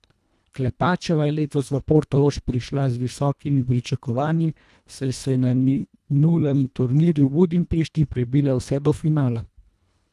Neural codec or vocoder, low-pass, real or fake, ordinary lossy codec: codec, 24 kHz, 1.5 kbps, HILCodec; none; fake; none